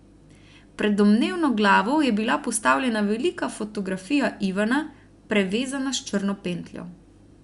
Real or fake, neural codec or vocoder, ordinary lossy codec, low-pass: real; none; Opus, 64 kbps; 10.8 kHz